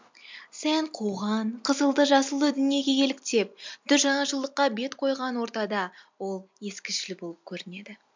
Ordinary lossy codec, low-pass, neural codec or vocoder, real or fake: MP3, 64 kbps; 7.2 kHz; none; real